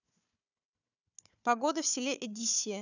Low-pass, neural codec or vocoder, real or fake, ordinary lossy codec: 7.2 kHz; codec, 16 kHz, 4 kbps, FunCodec, trained on Chinese and English, 50 frames a second; fake; none